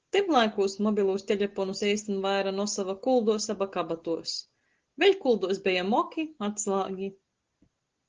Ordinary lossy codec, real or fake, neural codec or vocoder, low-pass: Opus, 16 kbps; real; none; 7.2 kHz